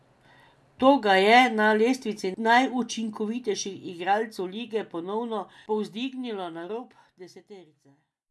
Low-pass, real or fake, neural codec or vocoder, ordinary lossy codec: none; real; none; none